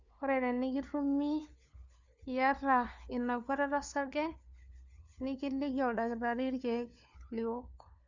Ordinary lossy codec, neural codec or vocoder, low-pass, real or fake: Opus, 64 kbps; codec, 16 kHz, 4 kbps, FunCodec, trained on LibriTTS, 50 frames a second; 7.2 kHz; fake